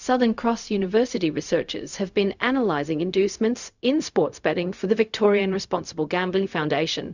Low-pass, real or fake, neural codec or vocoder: 7.2 kHz; fake; codec, 16 kHz, 0.4 kbps, LongCat-Audio-Codec